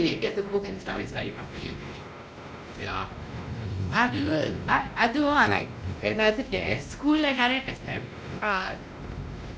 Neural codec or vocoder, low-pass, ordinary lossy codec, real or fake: codec, 16 kHz, 1 kbps, X-Codec, WavLM features, trained on Multilingual LibriSpeech; none; none; fake